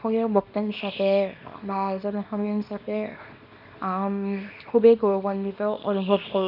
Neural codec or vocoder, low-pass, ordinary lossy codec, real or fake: codec, 24 kHz, 0.9 kbps, WavTokenizer, small release; 5.4 kHz; none; fake